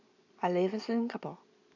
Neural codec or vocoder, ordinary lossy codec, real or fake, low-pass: none; AAC, 48 kbps; real; 7.2 kHz